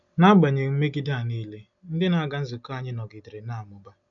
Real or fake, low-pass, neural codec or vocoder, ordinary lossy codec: real; 7.2 kHz; none; none